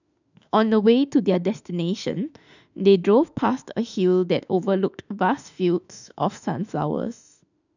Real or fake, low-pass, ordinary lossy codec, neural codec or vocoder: fake; 7.2 kHz; none; autoencoder, 48 kHz, 32 numbers a frame, DAC-VAE, trained on Japanese speech